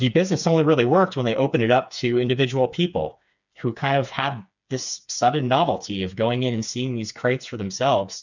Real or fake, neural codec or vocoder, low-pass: fake; codec, 16 kHz, 4 kbps, FreqCodec, smaller model; 7.2 kHz